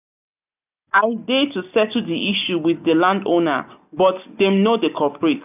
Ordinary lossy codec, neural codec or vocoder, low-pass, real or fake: none; none; 3.6 kHz; real